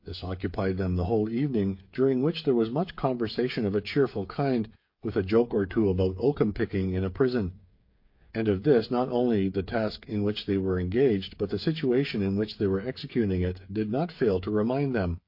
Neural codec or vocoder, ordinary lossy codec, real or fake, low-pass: codec, 16 kHz, 8 kbps, FreqCodec, smaller model; MP3, 32 kbps; fake; 5.4 kHz